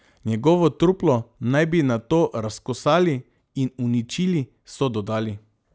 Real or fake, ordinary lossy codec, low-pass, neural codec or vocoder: real; none; none; none